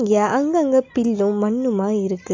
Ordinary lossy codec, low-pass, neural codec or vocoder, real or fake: AAC, 48 kbps; 7.2 kHz; none; real